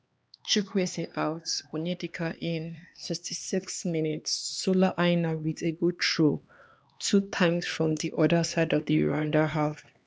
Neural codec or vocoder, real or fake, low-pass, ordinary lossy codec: codec, 16 kHz, 2 kbps, X-Codec, HuBERT features, trained on LibriSpeech; fake; none; none